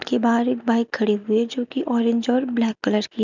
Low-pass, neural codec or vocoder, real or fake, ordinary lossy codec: 7.2 kHz; none; real; none